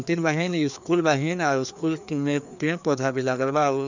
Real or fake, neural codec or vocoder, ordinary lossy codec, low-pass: fake; codec, 16 kHz, 2 kbps, FreqCodec, larger model; none; 7.2 kHz